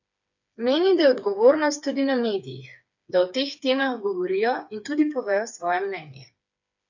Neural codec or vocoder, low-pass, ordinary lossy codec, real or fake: codec, 16 kHz, 4 kbps, FreqCodec, smaller model; 7.2 kHz; none; fake